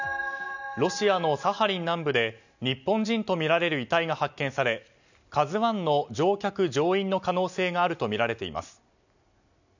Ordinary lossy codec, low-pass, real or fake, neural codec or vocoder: none; 7.2 kHz; real; none